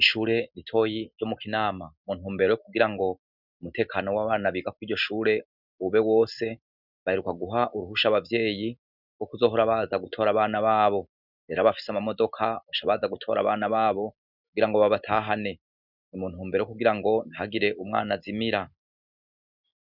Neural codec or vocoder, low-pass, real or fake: none; 5.4 kHz; real